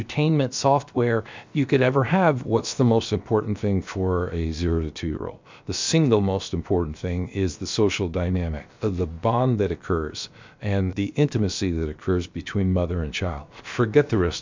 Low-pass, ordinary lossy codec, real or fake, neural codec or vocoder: 7.2 kHz; MP3, 64 kbps; fake; codec, 16 kHz, about 1 kbps, DyCAST, with the encoder's durations